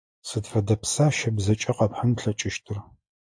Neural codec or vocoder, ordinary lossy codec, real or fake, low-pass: none; AAC, 64 kbps; real; 9.9 kHz